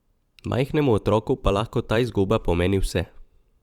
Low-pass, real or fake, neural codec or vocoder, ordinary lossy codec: 19.8 kHz; fake; vocoder, 44.1 kHz, 128 mel bands every 512 samples, BigVGAN v2; Opus, 64 kbps